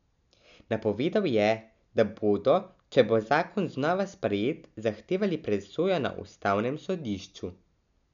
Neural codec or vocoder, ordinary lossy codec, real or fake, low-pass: none; none; real; 7.2 kHz